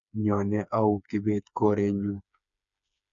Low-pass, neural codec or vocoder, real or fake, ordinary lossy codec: 7.2 kHz; codec, 16 kHz, 4 kbps, FreqCodec, smaller model; fake; none